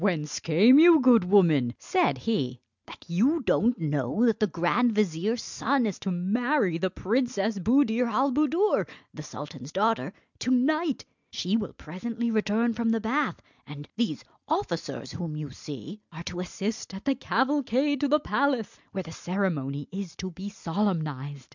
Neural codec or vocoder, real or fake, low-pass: none; real; 7.2 kHz